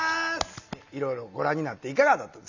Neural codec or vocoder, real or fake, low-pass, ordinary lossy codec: none; real; 7.2 kHz; none